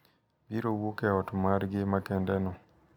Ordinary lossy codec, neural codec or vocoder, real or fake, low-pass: none; none; real; 19.8 kHz